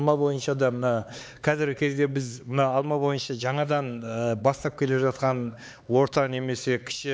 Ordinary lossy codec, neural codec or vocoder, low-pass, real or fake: none; codec, 16 kHz, 4 kbps, X-Codec, HuBERT features, trained on LibriSpeech; none; fake